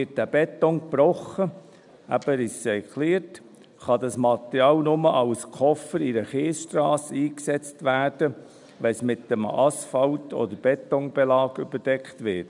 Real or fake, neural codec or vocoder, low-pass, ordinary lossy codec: real; none; 10.8 kHz; none